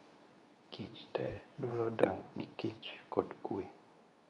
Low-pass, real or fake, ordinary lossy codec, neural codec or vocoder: 10.8 kHz; fake; none; codec, 24 kHz, 0.9 kbps, WavTokenizer, medium speech release version 2